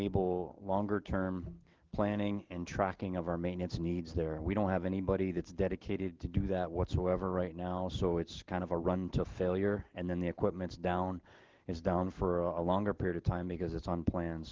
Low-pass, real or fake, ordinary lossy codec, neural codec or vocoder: 7.2 kHz; real; Opus, 16 kbps; none